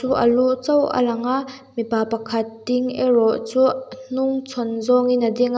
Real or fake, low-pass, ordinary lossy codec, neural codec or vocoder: real; none; none; none